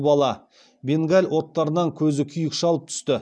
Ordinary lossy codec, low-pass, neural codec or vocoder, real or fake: none; none; none; real